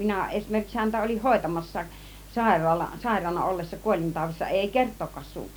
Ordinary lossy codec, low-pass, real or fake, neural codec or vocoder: none; none; real; none